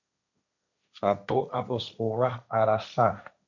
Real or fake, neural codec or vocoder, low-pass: fake; codec, 16 kHz, 1.1 kbps, Voila-Tokenizer; 7.2 kHz